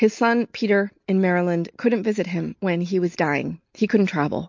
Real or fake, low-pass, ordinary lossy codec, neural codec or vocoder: real; 7.2 kHz; MP3, 48 kbps; none